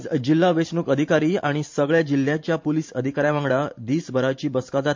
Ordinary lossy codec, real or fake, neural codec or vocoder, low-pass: MP3, 48 kbps; fake; vocoder, 44.1 kHz, 128 mel bands every 512 samples, BigVGAN v2; 7.2 kHz